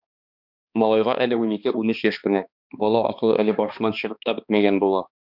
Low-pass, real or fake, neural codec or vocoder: 5.4 kHz; fake; codec, 16 kHz, 2 kbps, X-Codec, HuBERT features, trained on balanced general audio